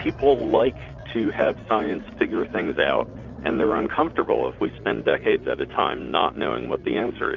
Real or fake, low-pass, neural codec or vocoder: fake; 7.2 kHz; vocoder, 44.1 kHz, 80 mel bands, Vocos